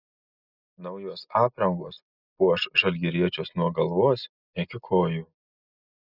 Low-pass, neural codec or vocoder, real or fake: 5.4 kHz; none; real